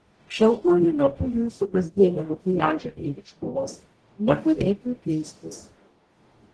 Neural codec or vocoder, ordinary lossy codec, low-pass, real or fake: codec, 44.1 kHz, 0.9 kbps, DAC; Opus, 16 kbps; 10.8 kHz; fake